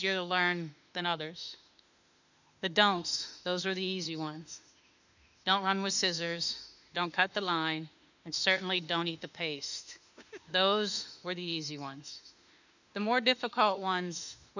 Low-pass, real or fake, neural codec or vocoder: 7.2 kHz; fake; autoencoder, 48 kHz, 32 numbers a frame, DAC-VAE, trained on Japanese speech